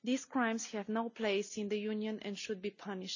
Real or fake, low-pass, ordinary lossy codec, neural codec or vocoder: real; 7.2 kHz; none; none